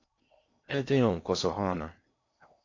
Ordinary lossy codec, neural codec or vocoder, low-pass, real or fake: AAC, 48 kbps; codec, 16 kHz in and 24 kHz out, 0.8 kbps, FocalCodec, streaming, 65536 codes; 7.2 kHz; fake